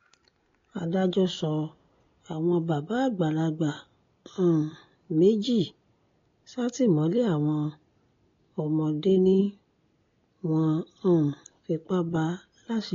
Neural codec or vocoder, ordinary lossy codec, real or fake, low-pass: none; AAC, 32 kbps; real; 7.2 kHz